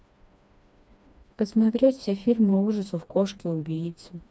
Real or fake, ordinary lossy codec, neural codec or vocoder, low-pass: fake; none; codec, 16 kHz, 2 kbps, FreqCodec, smaller model; none